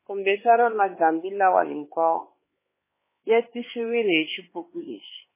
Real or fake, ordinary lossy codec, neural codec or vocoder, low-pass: fake; MP3, 16 kbps; codec, 16 kHz, 4 kbps, X-Codec, HuBERT features, trained on LibriSpeech; 3.6 kHz